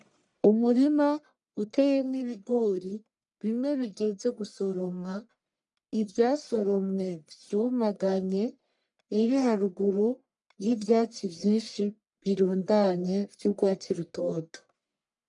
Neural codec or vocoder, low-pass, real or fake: codec, 44.1 kHz, 1.7 kbps, Pupu-Codec; 10.8 kHz; fake